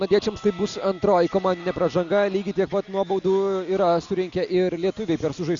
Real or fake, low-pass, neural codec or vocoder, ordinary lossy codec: real; 7.2 kHz; none; Opus, 64 kbps